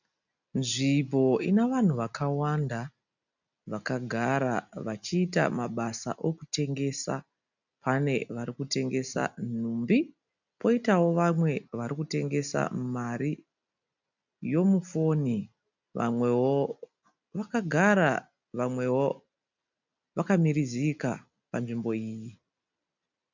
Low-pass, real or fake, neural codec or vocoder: 7.2 kHz; real; none